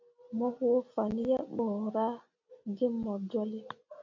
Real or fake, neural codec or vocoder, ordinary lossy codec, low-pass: real; none; MP3, 64 kbps; 7.2 kHz